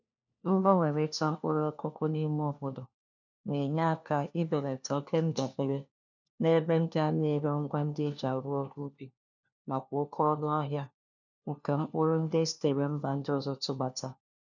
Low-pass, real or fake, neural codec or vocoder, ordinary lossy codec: 7.2 kHz; fake; codec, 16 kHz, 1 kbps, FunCodec, trained on LibriTTS, 50 frames a second; none